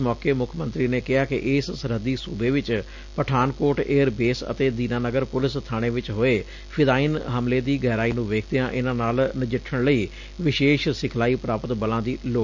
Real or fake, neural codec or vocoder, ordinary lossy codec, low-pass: real; none; none; 7.2 kHz